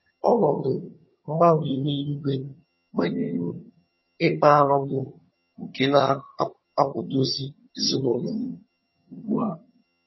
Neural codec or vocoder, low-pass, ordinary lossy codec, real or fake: vocoder, 22.05 kHz, 80 mel bands, HiFi-GAN; 7.2 kHz; MP3, 24 kbps; fake